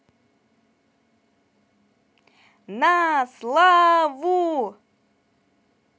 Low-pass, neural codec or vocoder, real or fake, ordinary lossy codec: none; none; real; none